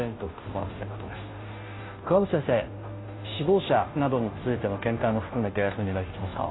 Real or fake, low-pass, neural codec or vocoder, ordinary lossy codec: fake; 7.2 kHz; codec, 16 kHz, 0.5 kbps, FunCodec, trained on Chinese and English, 25 frames a second; AAC, 16 kbps